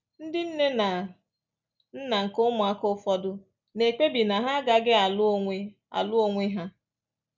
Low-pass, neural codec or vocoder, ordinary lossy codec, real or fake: 7.2 kHz; none; none; real